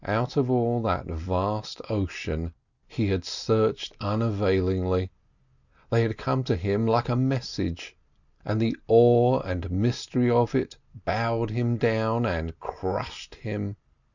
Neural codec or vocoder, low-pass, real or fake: none; 7.2 kHz; real